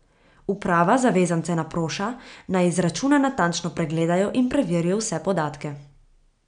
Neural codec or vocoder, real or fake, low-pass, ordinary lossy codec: none; real; 9.9 kHz; none